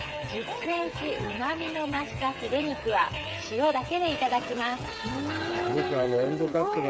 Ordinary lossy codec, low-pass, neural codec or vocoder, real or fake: none; none; codec, 16 kHz, 8 kbps, FreqCodec, smaller model; fake